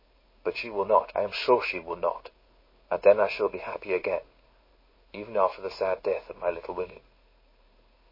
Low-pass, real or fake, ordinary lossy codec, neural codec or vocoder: 5.4 kHz; fake; MP3, 24 kbps; codec, 16 kHz in and 24 kHz out, 1 kbps, XY-Tokenizer